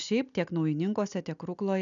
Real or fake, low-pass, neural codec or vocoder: real; 7.2 kHz; none